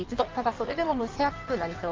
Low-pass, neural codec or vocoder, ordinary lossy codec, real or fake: 7.2 kHz; codec, 16 kHz in and 24 kHz out, 1.1 kbps, FireRedTTS-2 codec; Opus, 24 kbps; fake